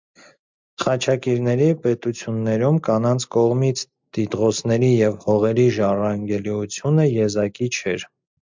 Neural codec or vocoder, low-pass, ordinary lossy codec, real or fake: none; 7.2 kHz; MP3, 64 kbps; real